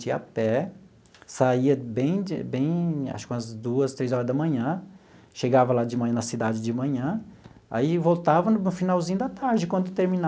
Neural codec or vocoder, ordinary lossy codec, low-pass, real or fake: none; none; none; real